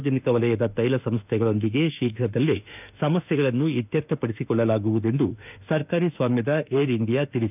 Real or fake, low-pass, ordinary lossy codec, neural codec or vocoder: fake; 3.6 kHz; none; autoencoder, 48 kHz, 32 numbers a frame, DAC-VAE, trained on Japanese speech